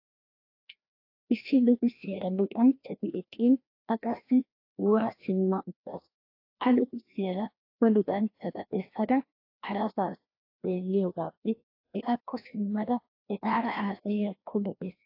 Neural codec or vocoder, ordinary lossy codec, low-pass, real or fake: codec, 16 kHz, 1 kbps, FreqCodec, larger model; AAC, 32 kbps; 5.4 kHz; fake